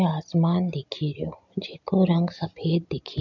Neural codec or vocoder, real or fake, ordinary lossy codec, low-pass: none; real; none; 7.2 kHz